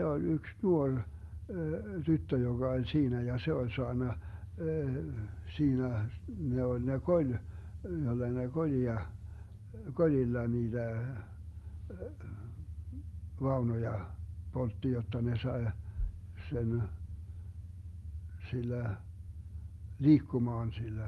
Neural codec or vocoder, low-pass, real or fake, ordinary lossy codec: none; 19.8 kHz; real; Opus, 32 kbps